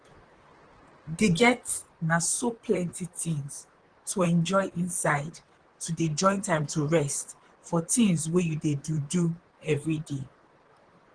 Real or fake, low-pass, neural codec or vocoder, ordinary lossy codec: fake; 9.9 kHz; vocoder, 44.1 kHz, 128 mel bands, Pupu-Vocoder; Opus, 16 kbps